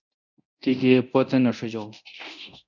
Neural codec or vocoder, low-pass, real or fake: codec, 24 kHz, 0.9 kbps, DualCodec; 7.2 kHz; fake